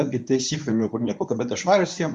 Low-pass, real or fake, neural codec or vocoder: 10.8 kHz; fake; codec, 24 kHz, 0.9 kbps, WavTokenizer, medium speech release version 1